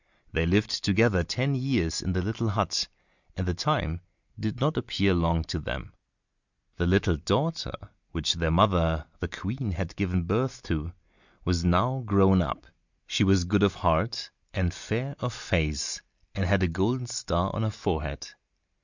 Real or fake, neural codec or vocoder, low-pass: real; none; 7.2 kHz